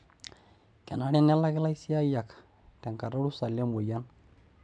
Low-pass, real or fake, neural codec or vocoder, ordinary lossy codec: 9.9 kHz; real; none; none